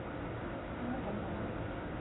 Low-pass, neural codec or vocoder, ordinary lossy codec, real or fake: 7.2 kHz; none; AAC, 16 kbps; real